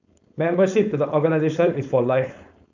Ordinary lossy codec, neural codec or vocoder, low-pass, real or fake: none; codec, 16 kHz, 4.8 kbps, FACodec; 7.2 kHz; fake